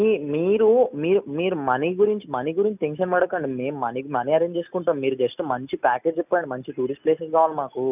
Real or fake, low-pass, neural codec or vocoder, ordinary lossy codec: real; 3.6 kHz; none; none